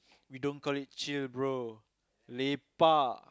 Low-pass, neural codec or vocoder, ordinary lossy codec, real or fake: none; none; none; real